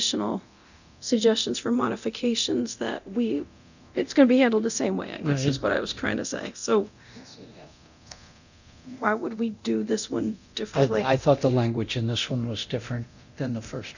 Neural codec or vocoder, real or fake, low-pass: codec, 24 kHz, 0.9 kbps, DualCodec; fake; 7.2 kHz